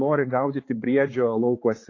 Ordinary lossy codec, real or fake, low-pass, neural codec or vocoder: AAC, 32 kbps; fake; 7.2 kHz; codec, 16 kHz, 4 kbps, X-Codec, HuBERT features, trained on balanced general audio